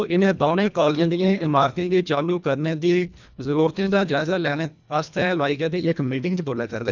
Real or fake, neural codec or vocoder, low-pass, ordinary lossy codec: fake; codec, 24 kHz, 1.5 kbps, HILCodec; 7.2 kHz; none